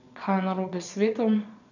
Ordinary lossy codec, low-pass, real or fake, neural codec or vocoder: none; 7.2 kHz; fake; vocoder, 22.05 kHz, 80 mel bands, Vocos